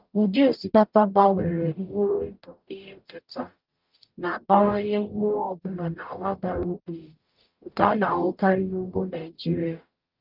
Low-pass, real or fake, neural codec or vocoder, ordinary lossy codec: 5.4 kHz; fake; codec, 44.1 kHz, 0.9 kbps, DAC; Opus, 24 kbps